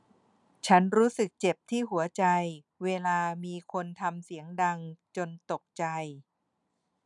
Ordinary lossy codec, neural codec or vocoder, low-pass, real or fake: none; none; 10.8 kHz; real